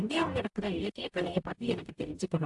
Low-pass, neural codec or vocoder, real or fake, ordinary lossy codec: 10.8 kHz; codec, 44.1 kHz, 0.9 kbps, DAC; fake; AAC, 64 kbps